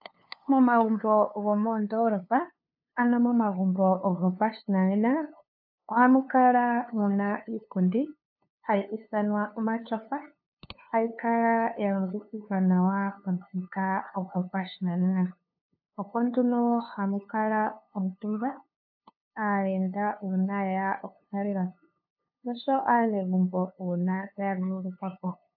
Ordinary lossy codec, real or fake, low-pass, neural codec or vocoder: AAC, 48 kbps; fake; 5.4 kHz; codec, 16 kHz, 2 kbps, FunCodec, trained on LibriTTS, 25 frames a second